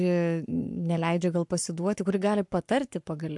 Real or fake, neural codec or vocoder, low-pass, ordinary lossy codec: fake; codec, 44.1 kHz, 7.8 kbps, Pupu-Codec; 10.8 kHz; MP3, 64 kbps